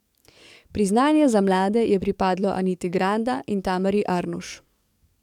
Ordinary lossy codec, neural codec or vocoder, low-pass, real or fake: none; codec, 44.1 kHz, 7.8 kbps, DAC; 19.8 kHz; fake